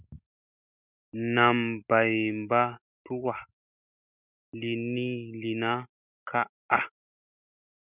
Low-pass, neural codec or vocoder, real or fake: 3.6 kHz; none; real